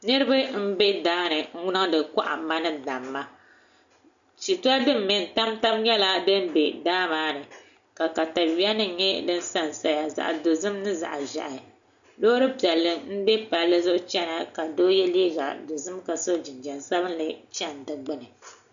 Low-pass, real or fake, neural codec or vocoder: 7.2 kHz; real; none